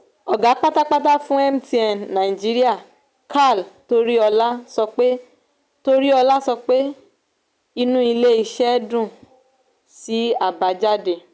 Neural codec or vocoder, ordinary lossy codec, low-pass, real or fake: none; none; none; real